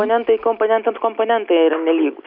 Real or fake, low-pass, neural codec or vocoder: real; 5.4 kHz; none